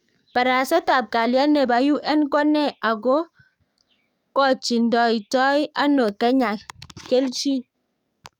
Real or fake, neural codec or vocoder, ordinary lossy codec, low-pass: fake; codec, 44.1 kHz, 7.8 kbps, DAC; none; 19.8 kHz